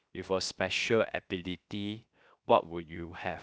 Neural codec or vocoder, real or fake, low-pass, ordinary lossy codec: codec, 16 kHz, 0.7 kbps, FocalCodec; fake; none; none